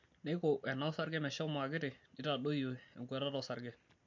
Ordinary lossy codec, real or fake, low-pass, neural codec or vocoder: MP3, 48 kbps; real; 7.2 kHz; none